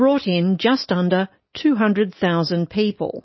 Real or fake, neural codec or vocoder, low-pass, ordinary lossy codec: real; none; 7.2 kHz; MP3, 24 kbps